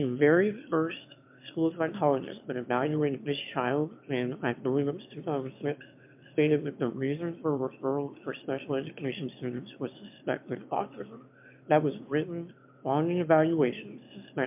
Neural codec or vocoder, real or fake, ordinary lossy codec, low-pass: autoencoder, 22.05 kHz, a latent of 192 numbers a frame, VITS, trained on one speaker; fake; MP3, 32 kbps; 3.6 kHz